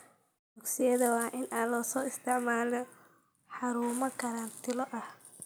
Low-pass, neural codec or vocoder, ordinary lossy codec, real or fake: none; none; none; real